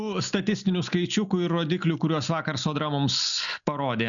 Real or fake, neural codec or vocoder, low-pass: real; none; 7.2 kHz